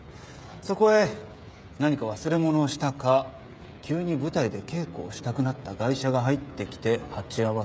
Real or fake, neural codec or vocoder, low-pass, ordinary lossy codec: fake; codec, 16 kHz, 16 kbps, FreqCodec, smaller model; none; none